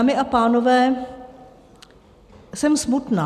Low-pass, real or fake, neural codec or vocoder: 14.4 kHz; real; none